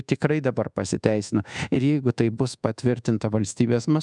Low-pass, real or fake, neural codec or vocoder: 10.8 kHz; fake; codec, 24 kHz, 1.2 kbps, DualCodec